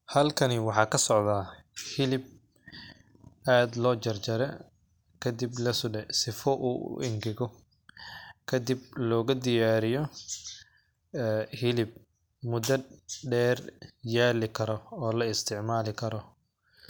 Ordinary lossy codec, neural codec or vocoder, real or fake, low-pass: none; none; real; none